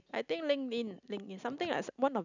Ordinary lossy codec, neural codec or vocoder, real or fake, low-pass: none; none; real; 7.2 kHz